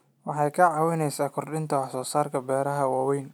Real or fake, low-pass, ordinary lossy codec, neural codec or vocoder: real; none; none; none